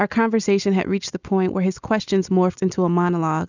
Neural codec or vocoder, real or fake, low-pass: none; real; 7.2 kHz